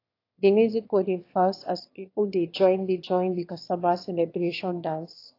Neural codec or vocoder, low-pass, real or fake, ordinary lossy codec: autoencoder, 22.05 kHz, a latent of 192 numbers a frame, VITS, trained on one speaker; 5.4 kHz; fake; AAC, 32 kbps